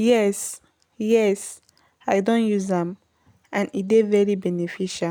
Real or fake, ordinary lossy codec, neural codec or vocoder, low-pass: real; none; none; none